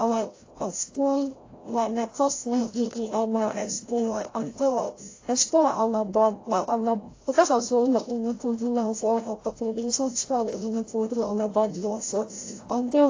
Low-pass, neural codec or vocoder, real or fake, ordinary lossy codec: 7.2 kHz; codec, 16 kHz, 0.5 kbps, FreqCodec, larger model; fake; AAC, 32 kbps